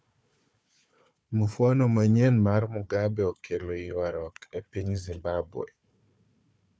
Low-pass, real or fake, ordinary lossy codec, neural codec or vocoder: none; fake; none; codec, 16 kHz, 4 kbps, FunCodec, trained on Chinese and English, 50 frames a second